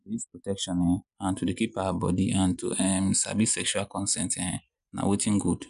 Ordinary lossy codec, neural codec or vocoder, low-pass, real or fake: none; none; 10.8 kHz; real